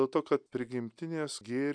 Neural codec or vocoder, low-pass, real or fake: none; 9.9 kHz; real